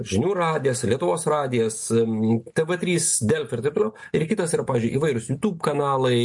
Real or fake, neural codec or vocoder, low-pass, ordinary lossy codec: real; none; 10.8 kHz; MP3, 48 kbps